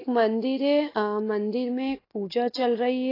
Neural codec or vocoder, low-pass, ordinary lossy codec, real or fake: none; 5.4 kHz; AAC, 24 kbps; real